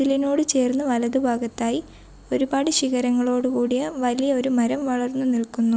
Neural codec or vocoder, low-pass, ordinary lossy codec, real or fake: none; none; none; real